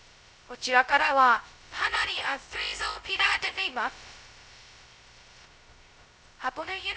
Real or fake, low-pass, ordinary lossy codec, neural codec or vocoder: fake; none; none; codec, 16 kHz, 0.2 kbps, FocalCodec